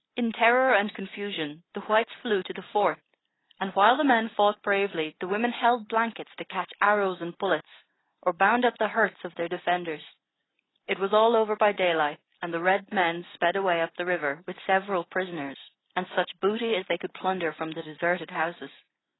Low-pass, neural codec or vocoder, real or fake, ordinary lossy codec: 7.2 kHz; none; real; AAC, 16 kbps